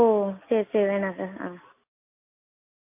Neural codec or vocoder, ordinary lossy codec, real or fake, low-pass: none; MP3, 24 kbps; real; 3.6 kHz